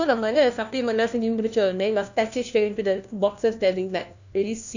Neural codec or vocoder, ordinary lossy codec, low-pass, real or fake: codec, 16 kHz, 1 kbps, FunCodec, trained on LibriTTS, 50 frames a second; none; 7.2 kHz; fake